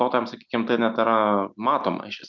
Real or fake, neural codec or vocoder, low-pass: real; none; 7.2 kHz